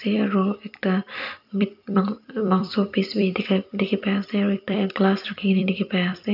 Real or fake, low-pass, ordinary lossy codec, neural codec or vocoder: fake; 5.4 kHz; none; vocoder, 44.1 kHz, 128 mel bands every 256 samples, BigVGAN v2